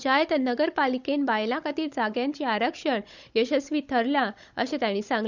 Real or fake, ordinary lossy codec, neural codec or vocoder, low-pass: fake; none; codec, 16 kHz, 16 kbps, FunCodec, trained on Chinese and English, 50 frames a second; 7.2 kHz